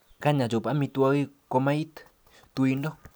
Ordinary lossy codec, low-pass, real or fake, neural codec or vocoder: none; none; real; none